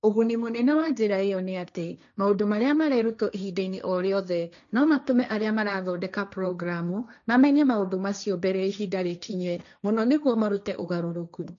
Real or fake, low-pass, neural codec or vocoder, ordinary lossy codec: fake; 7.2 kHz; codec, 16 kHz, 1.1 kbps, Voila-Tokenizer; none